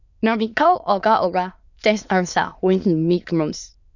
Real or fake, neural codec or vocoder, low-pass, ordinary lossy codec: fake; autoencoder, 22.05 kHz, a latent of 192 numbers a frame, VITS, trained on many speakers; 7.2 kHz; none